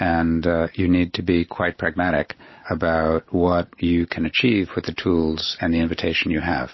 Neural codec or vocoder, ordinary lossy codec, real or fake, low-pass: none; MP3, 24 kbps; real; 7.2 kHz